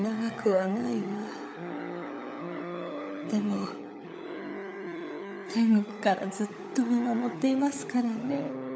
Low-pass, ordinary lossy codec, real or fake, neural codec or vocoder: none; none; fake; codec, 16 kHz, 16 kbps, FunCodec, trained on LibriTTS, 50 frames a second